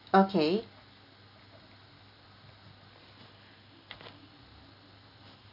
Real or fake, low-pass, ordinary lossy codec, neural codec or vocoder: real; 5.4 kHz; none; none